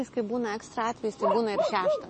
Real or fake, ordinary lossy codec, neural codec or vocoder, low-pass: real; MP3, 32 kbps; none; 10.8 kHz